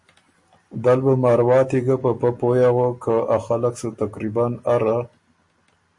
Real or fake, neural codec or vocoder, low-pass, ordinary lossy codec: real; none; 10.8 kHz; MP3, 48 kbps